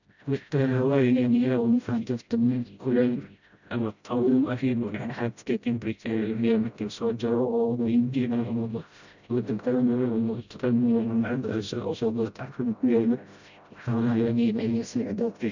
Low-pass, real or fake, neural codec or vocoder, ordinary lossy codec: 7.2 kHz; fake; codec, 16 kHz, 0.5 kbps, FreqCodec, smaller model; none